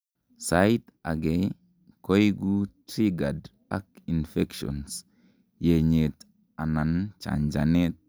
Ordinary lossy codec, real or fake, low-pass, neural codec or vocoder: none; real; none; none